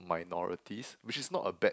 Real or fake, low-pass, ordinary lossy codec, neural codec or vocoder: real; none; none; none